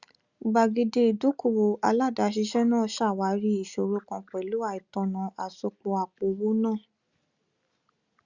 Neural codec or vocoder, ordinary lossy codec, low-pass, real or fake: none; Opus, 64 kbps; 7.2 kHz; real